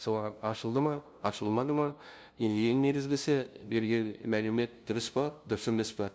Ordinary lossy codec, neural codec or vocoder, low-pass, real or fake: none; codec, 16 kHz, 0.5 kbps, FunCodec, trained on LibriTTS, 25 frames a second; none; fake